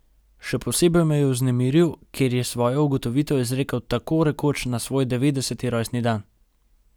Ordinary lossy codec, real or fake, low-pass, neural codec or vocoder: none; real; none; none